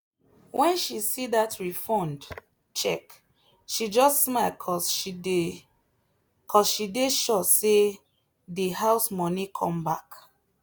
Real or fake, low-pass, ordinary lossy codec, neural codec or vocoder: real; none; none; none